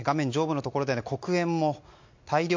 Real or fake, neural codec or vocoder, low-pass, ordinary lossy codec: real; none; 7.2 kHz; MP3, 48 kbps